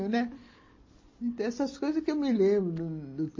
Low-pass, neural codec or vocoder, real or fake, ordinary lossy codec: 7.2 kHz; none; real; MP3, 32 kbps